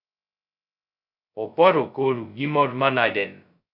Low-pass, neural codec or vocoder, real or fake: 5.4 kHz; codec, 16 kHz, 0.2 kbps, FocalCodec; fake